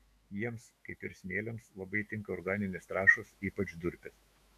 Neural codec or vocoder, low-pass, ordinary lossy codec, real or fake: autoencoder, 48 kHz, 128 numbers a frame, DAC-VAE, trained on Japanese speech; 14.4 kHz; AAC, 64 kbps; fake